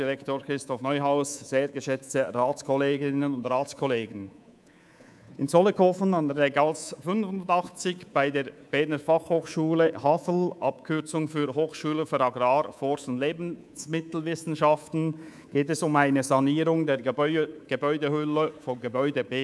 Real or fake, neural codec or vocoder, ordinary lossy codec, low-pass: fake; codec, 24 kHz, 3.1 kbps, DualCodec; none; none